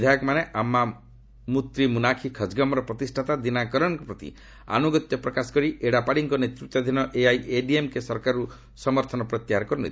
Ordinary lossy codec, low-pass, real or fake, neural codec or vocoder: none; none; real; none